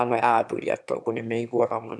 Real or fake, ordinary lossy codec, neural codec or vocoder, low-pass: fake; none; autoencoder, 22.05 kHz, a latent of 192 numbers a frame, VITS, trained on one speaker; none